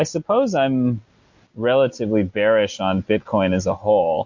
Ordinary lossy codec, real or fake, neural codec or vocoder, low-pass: MP3, 48 kbps; real; none; 7.2 kHz